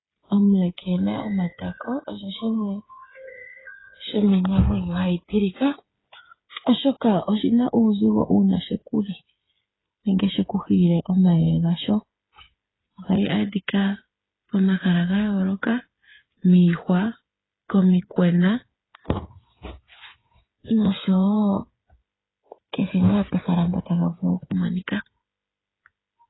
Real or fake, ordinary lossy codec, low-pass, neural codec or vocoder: fake; AAC, 16 kbps; 7.2 kHz; codec, 16 kHz, 8 kbps, FreqCodec, smaller model